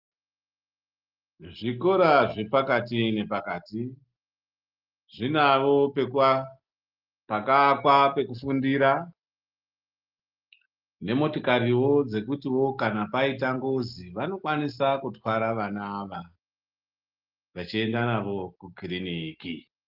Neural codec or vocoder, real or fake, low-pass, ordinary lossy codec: none; real; 5.4 kHz; Opus, 32 kbps